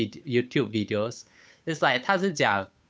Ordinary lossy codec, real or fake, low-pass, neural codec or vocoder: none; fake; none; codec, 16 kHz, 8 kbps, FunCodec, trained on Chinese and English, 25 frames a second